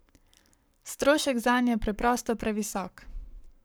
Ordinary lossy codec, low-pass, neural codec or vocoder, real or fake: none; none; codec, 44.1 kHz, 7.8 kbps, Pupu-Codec; fake